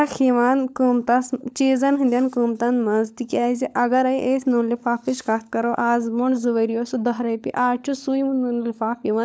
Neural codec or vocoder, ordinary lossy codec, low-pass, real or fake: codec, 16 kHz, 16 kbps, FunCodec, trained on LibriTTS, 50 frames a second; none; none; fake